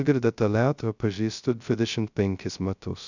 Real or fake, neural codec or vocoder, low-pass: fake; codec, 16 kHz, 0.2 kbps, FocalCodec; 7.2 kHz